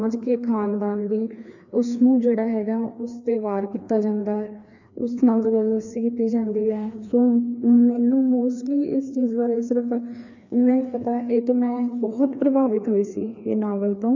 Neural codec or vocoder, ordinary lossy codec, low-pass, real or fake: codec, 16 kHz, 2 kbps, FreqCodec, larger model; none; 7.2 kHz; fake